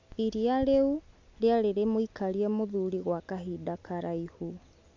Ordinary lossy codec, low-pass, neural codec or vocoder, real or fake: MP3, 64 kbps; 7.2 kHz; none; real